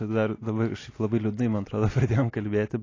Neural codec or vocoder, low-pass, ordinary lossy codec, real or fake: none; 7.2 kHz; AAC, 32 kbps; real